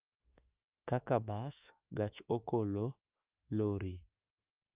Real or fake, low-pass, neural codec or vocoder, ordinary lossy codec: fake; 3.6 kHz; autoencoder, 48 kHz, 32 numbers a frame, DAC-VAE, trained on Japanese speech; Opus, 24 kbps